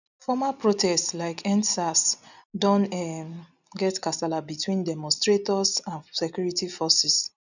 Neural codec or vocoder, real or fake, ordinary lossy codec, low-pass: none; real; none; 7.2 kHz